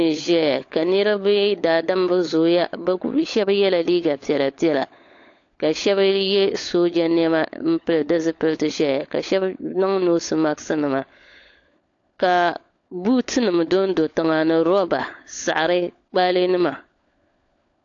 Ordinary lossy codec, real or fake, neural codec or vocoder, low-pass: AAC, 48 kbps; fake; codec, 16 kHz, 16 kbps, FunCodec, trained on LibriTTS, 50 frames a second; 7.2 kHz